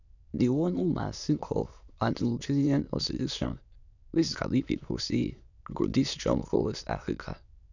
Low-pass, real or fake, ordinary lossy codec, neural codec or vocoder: 7.2 kHz; fake; none; autoencoder, 22.05 kHz, a latent of 192 numbers a frame, VITS, trained on many speakers